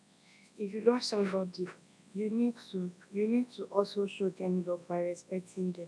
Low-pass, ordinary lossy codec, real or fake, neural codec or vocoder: none; none; fake; codec, 24 kHz, 0.9 kbps, WavTokenizer, large speech release